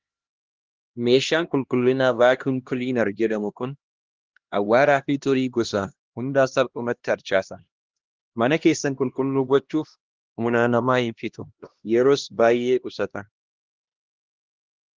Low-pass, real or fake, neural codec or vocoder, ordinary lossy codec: 7.2 kHz; fake; codec, 16 kHz, 1 kbps, X-Codec, HuBERT features, trained on LibriSpeech; Opus, 16 kbps